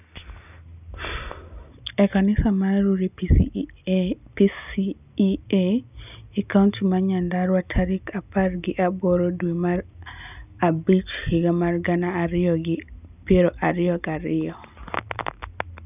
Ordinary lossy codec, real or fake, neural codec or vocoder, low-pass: none; real; none; 3.6 kHz